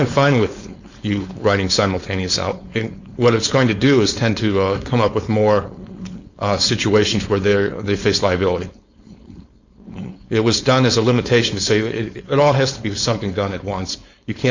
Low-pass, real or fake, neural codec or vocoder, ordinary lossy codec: 7.2 kHz; fake; codec, 16 kHz, 4.8 kbps, FACodec; Opus, 64 kbps